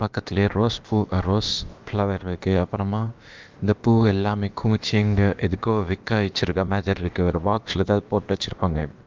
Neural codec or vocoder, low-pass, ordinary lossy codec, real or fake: codec, 16 kHz, about 1 kbps, DyCAST, with the encoder's durations; 7.2 kHz; Opus, 32 kbps; fake